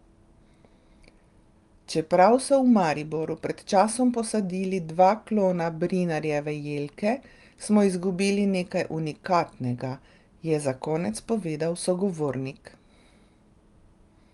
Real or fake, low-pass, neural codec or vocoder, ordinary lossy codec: real; 10.8 kHz; none; Opus, 32 kbps